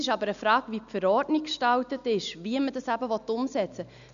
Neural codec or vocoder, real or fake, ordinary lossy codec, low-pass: none; real; none; 7.2 kHz